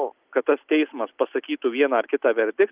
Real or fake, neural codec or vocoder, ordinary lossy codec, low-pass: real; none; Opus, 24 kbps; 3.6 kHz